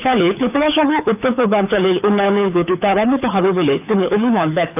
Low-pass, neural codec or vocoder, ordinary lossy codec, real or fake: 3.6 kHz; codec, 24 kHz, 6 kbps, HILCodec; none; fake